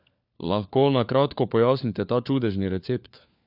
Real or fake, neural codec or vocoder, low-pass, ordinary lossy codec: fake; codec, 16 kHz, 4 kbps, FunCodec, trained on LibriTTS, 50 frames a second; 5.4 kHz; none